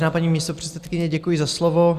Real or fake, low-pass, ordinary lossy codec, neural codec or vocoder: real; 14.4 kHz; Opus, 64 kbps; none